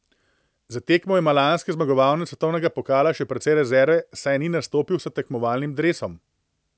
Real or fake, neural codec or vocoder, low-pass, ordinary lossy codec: real; none; none; none